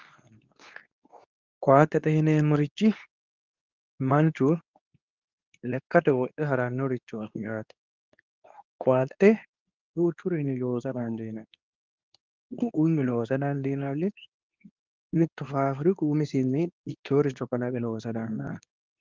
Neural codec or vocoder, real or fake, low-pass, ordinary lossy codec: codec, 24 kHz, 0.9 kbps, WavTokenizer, medium speech release version 2; fake; 7.2 kHz; Opus, 32 kbps